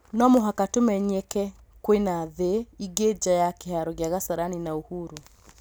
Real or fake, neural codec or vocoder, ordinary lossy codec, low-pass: real; none; none; none